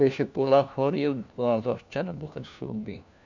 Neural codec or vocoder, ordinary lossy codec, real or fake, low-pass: codec, 16 kHz, 1 kbps, FunCodec, trained on LibriTTS, 50 frames a second; none; fake; 7.2 kHz